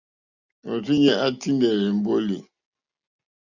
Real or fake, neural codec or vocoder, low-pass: real; none; 7.2 kHz